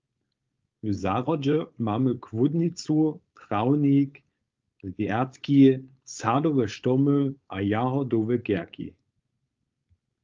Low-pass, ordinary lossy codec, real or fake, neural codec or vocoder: 7.2 kHz; Opus, 32 kbps; fake; codec, 16 kHz, 4.8 kbps, FACodec